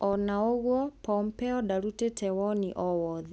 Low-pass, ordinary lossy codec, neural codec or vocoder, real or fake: none; none; none; real